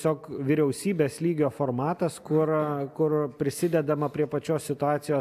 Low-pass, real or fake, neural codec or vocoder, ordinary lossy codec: 14.4 kHz; real; none; AAC, 96 kbps